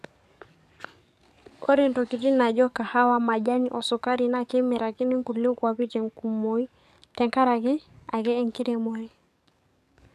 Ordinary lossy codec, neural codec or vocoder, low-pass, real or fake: none; codec, 44.1 kHz, 7.8 kbps, DAC; 14.4 kHz; fake